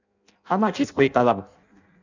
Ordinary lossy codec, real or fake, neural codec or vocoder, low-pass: none; fake; codec, 16 kHz in and 24 kHz out, 0.6 kbps, FireRedTTS-2 codec; 7.2 kHz